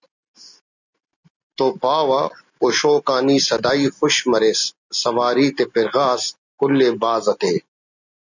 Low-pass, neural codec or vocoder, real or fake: 7.2 kHz; none; real